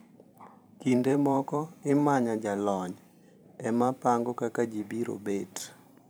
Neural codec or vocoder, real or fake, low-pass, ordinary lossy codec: vocoder, 44.1 kHz, 128 mel bands every 512 samples, BigVGAN v2; fake; none; none